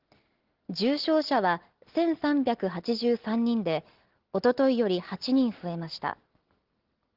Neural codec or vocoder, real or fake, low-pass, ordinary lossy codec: none; real; 5.4 kHz; Opus, 16 kbps